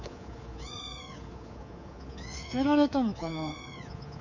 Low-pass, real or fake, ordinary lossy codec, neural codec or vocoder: 7.2 kHz; fake; none; codec, 24 kHz, 3.1 kbps, DualCodec